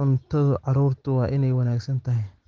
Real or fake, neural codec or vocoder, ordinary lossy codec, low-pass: real; none; Opus, 16 kbps; 7.2 kHz